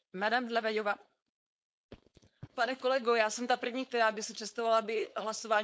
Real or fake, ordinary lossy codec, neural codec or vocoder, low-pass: fake; none; codec, 16 kHz, 4.8 kbps, FACodec; none